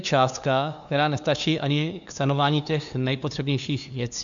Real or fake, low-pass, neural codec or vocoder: fake; 7.2 kHz; codec, 16 kHz, 2 kbps, FunCodec, trained on LibriTTS, 25 frames a second